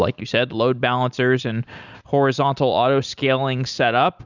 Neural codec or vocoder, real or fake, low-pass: none; real; 7.2 kHz